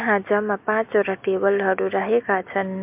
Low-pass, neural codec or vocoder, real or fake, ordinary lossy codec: 3.6 kHz; none; real; MP3, 32 kbps